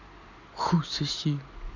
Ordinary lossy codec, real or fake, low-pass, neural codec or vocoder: none; real; 7.2 kHz; none